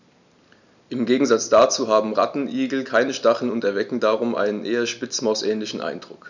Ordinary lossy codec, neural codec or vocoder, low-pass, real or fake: none; none; 7.2 kHz; real